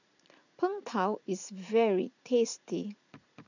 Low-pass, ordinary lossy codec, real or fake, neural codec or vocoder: 7.2 kHz; none; fake; vocoder, 44.1 kHz, 80 mel bands, Vocos